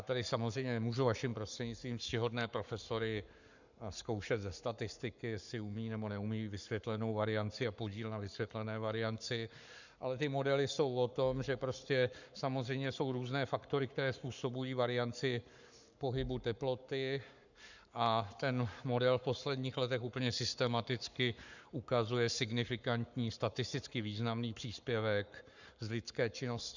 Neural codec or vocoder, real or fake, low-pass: codec, 44.1 kHz, 7.8 kbps, Pupu-Codec; fake; 7.2 kHz